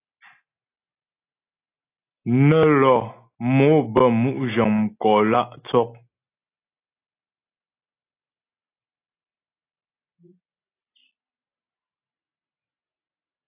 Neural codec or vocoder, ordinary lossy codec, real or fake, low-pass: none; AAC, 24 kbps; real; 3.6 kHz